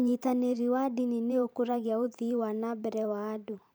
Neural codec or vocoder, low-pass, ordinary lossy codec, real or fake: vocoder, 44.1 kHz, 128 mel bands every 512 samples, BigVGAN v2; none; none; fake